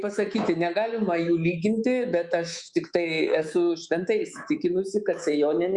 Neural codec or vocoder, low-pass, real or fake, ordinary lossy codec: codec, 24 kHz, 3.1 kbps, DualCodec; 10.8 kHz; fake; Opus, 64 kbps